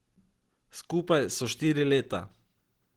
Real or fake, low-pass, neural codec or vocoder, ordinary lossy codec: real; 19.8 kHz; none; Opus, 16 kbps